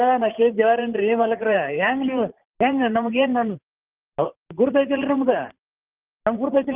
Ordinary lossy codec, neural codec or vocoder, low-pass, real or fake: Opus, 32 kbps; vocoder, 44.1 kHz, 128 mel bands every 512 samples, BigVGAN v2; 3.6 kHz; fake